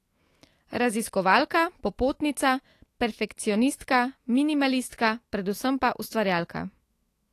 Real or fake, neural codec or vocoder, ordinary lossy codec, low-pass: fake; autoencoder, 48 kHz, 128 numbers a frame, DAC-VAE, trained on Japanese speech; AAC, 48 kbps; 14.4 kHz